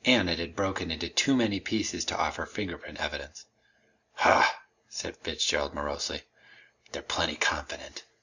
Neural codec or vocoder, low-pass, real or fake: none; 7.2 kHz; real